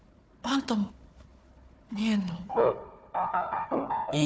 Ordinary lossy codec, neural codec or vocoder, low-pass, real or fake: none; codec, 16 kHz, 4 kbps, FunCodec, trained on Chinese and English, 50 frames a second; none; fake